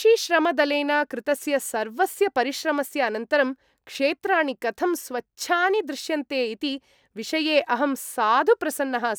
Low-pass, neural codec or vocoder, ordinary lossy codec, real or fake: none; none; none; real